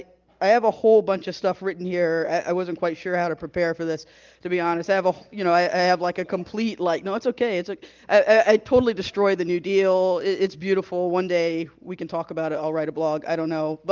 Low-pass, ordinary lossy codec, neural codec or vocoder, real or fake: 7.2 kHz; Opus, 32 kbps; none; real